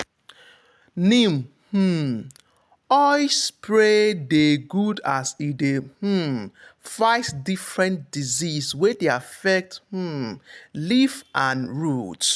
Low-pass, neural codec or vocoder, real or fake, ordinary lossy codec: none; none; real; none